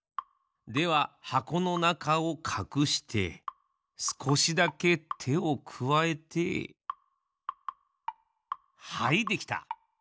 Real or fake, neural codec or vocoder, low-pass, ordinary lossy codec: real; none; none; none